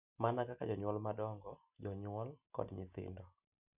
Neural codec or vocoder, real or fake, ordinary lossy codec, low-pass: none; real; none; 3.6 kHz